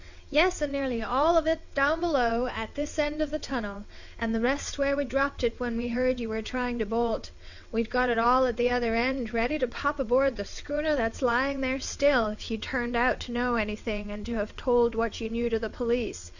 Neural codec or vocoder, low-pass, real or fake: vocoder, 22.05 kHz, 80 mel bands, WaveNeXt; 7.2 kHz; fake